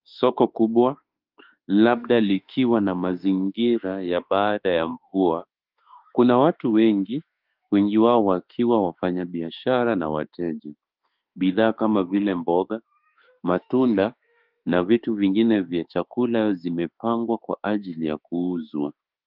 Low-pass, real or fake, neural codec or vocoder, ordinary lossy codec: 5.4 kHz; fake; autoencoder, 48 kHz, 32 numbers a frame, DAC-VAE, trained on Japanese speech; Opus, 32 kbps